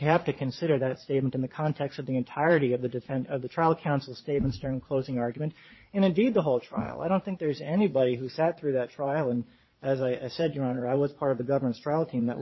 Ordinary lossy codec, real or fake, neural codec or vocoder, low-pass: MP3, 24 kbps; fake; vocoder, 22.05 kHz, 80 mel bands, Vocos; 7.2 kHz